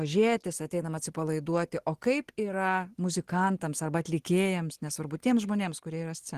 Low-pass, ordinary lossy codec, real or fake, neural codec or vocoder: 14.4 kHz; Opus, 16 kbps; real; none